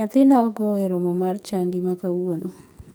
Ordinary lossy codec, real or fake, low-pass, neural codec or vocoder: none; fake; none; codec, 44.1 kHz, 2.6 kbps, SNAC